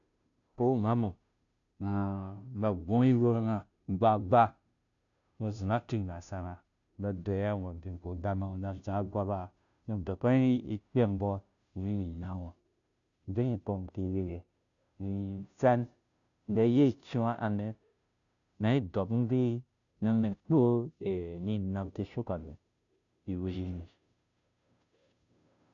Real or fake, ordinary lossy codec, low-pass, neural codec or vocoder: fake; none; 7.2 kHz; codec, 16 kHz, 0.5 kbps, FunCodec, trained on Chinese and English, 25 frames a second